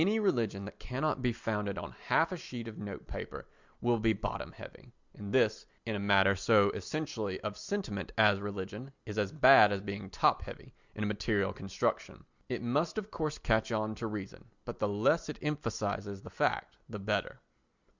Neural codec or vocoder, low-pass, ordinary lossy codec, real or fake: none; 7.2 kHz; Opus, 64 kbps; real